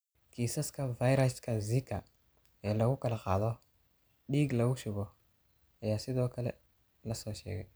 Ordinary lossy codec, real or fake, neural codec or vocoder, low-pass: none; real; none; none